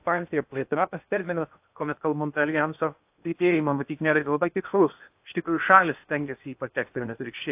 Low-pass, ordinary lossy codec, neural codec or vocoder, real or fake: 3.6 kHz; AAC, 32 kbps; codec, 16 kHz in and 24 kHz out, 0.6 kbps, FocalCodec, streaming, 2048 codes; fake